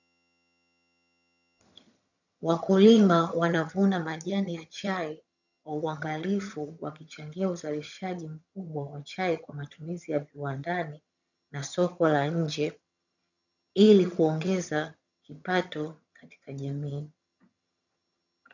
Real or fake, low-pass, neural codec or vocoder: fake; 7.2 kHz; vocoder, 22.05 kHz, 80 mel bands, HiFi-GAN